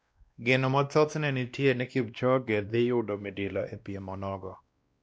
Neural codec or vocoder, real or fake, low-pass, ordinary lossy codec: codec, 16 kHz, 1 kbps, X-Codec, WavLM features, trained on Multilingual LibriSpeech; fake; none; none